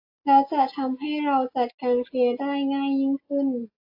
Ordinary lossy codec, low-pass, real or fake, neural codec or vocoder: AAC, 32 kbps; 5.4 kHz; real; none